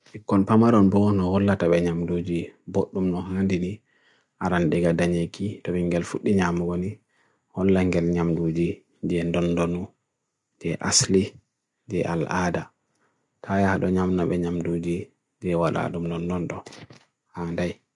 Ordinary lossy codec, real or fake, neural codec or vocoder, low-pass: none; real; none; 10.8 kHz